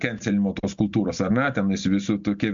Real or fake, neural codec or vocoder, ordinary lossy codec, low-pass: real; none; MP3, 64 kbps; 7.2 kHz